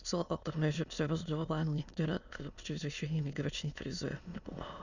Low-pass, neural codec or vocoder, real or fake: 7.2 kHz; autoencoder, 22.05 kHz, a latent of 192 numbers a frame, VITS, trained on many speakers; fake